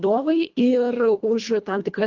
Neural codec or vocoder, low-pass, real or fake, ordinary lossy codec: codec, 24 kHz, 1.5 kbps, HILCodec; 7.2 kHz; fake; Opus, 24 kbps